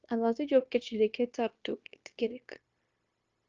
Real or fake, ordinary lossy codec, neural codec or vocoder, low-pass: fake; Opus, 24 kbps; codec, 16 kHz, 0.9 kbps, LongCat-Audio-Codec; 7.2 kHz